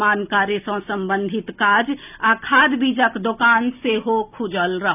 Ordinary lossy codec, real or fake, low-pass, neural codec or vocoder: none; real; 3.6 kHz; none